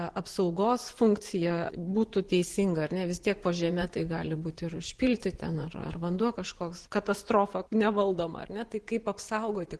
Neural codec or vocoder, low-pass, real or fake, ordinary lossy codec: vocoder, 24 kHz, 100 mel bands, Vocos; 10.8 kHz; fake; Opus, 16 kbps